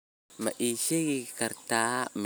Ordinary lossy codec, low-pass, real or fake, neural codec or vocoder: none; none; real; none